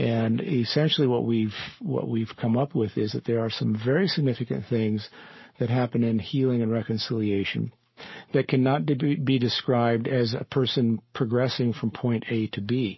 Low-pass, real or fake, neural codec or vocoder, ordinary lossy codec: 7.2 kHz; fake; codec, 44.1 kHz, 7.8 kbps, Pupu-Codec; MP3, 24 kbps